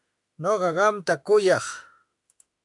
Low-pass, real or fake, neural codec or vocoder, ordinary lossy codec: 10.8 kHz; fake; autoencoder, 48 kHz, 32 numbers a frame, DAC-VAE, trained on Japanese speech; AAC, 64 kbps